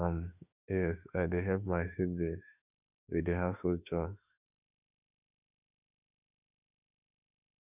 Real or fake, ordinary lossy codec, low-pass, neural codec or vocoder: fake; none; 3.6 kHz; autoencoder, 48 kHz, 32 numbers a frame, DAC-VAE, trained on Japanese speech